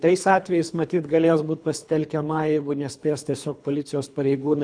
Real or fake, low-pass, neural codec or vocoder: fake; 9.9 kHz; codec, 24 kHz, 3 kbps, HILCodec